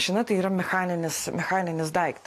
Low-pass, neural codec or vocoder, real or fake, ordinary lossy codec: 14.4 kHz; none; real; AAC, 64 kbps